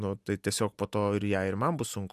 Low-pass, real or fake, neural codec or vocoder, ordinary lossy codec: 14.4 kHz; real; none; MP3, 96 kbps